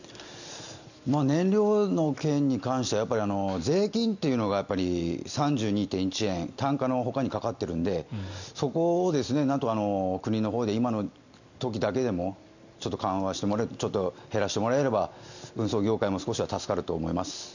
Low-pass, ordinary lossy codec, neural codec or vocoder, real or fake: 7.2 kHz; none; none; real